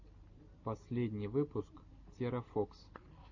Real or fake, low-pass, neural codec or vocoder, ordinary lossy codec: real; 7.2 kHz; none; AAC, 48 kbps